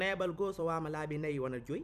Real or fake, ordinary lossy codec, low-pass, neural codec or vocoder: fake; none; 14.4 kHz; vocoder, 44.1 kHz, 128 mel bands every 256 samples, BigVGAN v2